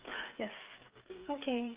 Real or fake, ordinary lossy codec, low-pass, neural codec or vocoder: fake; Opus, 24 kbps; 3.6 kHz; codec, 16 kHz, 8 kbps, FreqCodec, smaller model